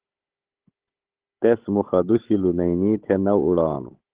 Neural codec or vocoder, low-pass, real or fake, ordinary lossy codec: codec, 16 kHz, 16 kbps, FunCodec, trained on Chinese and English, 50 frames a second; 3.6 kHz; fake; Opus, 16 kbps